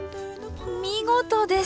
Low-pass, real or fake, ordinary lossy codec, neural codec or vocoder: none; real; none; none